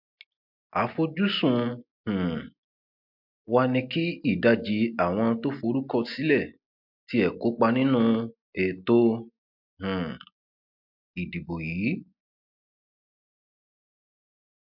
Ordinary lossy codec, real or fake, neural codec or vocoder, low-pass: MP3, 48 kbps; real; none; 5.4 kHz